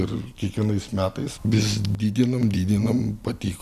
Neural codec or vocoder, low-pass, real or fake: none; 14.4 kHz; real